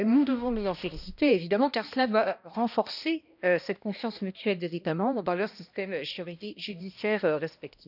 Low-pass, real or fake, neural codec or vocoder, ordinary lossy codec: 5.4 kHz; fake; codec, 16 kHz, 1 kbps, X-Codec, HuBERT features, trained on balanced general audio; none